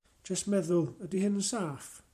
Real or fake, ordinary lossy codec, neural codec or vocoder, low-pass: fake; MP3, 96 kbps; vocoder, 44.1 kHz, 128 mel bands every 512 samples, BigVGAN v2; 14.4 kHz